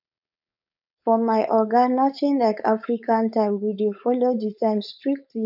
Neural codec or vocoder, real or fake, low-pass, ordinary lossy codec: codec, 16 kHz, 4.8 kbps, FACodec; fake; 5.4 kHz; none